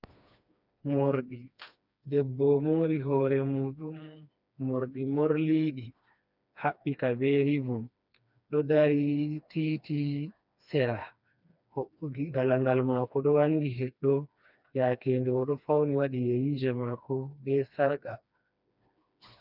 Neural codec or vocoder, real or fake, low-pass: codec, 16 kHz, 2 kbps, FreqCodec, smaller model; fake; 5.4 kHz